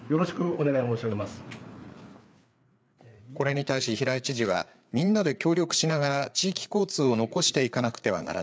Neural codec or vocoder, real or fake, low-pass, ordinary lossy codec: codec, 16 kHz, 4 kbps, FreqCodec, larger model; fake; none; none